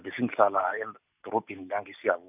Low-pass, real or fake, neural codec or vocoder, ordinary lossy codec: 3.6 kHz; real; none; none